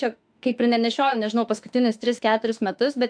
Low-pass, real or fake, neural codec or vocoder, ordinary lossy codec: 9.9 kHz; fake; autoencoder, 48 kHz, 32 numbers a frame, DAC-VAE, trained on Japanese speech; AAC, 64 kbps